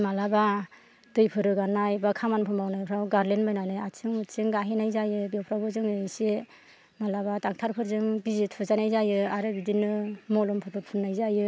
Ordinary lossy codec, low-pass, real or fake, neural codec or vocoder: none; none; real; none